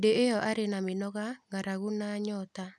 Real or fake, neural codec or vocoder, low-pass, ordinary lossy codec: real; none; none; none